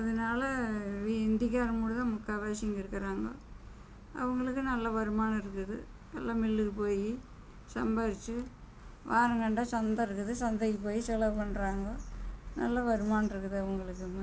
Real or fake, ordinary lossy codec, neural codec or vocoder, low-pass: real; none; none; none